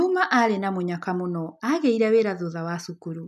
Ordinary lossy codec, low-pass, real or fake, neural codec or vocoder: none; 14.4 kHz; real; none